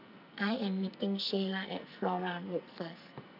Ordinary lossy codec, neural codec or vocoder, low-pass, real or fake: none; codec, 44.1 kHz, 2.6 kbps, SNAC; 5.4 kHz; fake